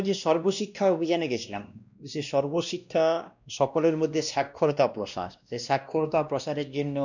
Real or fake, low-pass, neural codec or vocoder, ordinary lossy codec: fake; 7.2 kHz; codec, 16 kHz, 1 kbps, X-Codec, WavLM features, trained on Multilingual LibriSpeech; none